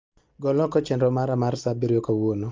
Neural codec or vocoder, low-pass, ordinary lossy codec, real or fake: none; 7.2 kHz; Opus, 32 kbps; real